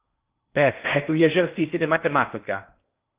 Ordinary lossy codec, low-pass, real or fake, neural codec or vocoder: Opus, 32 kbps; 3.6 kHz; fake; codec, 16 kHz in and 24 kHz out, 0.6 kbps, FocalCodec, streaming, 4096 codes